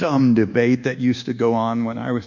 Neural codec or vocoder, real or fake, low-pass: codec, 24 kHz, 1.2 kbps, DualCodec; fake; 7.2 kHz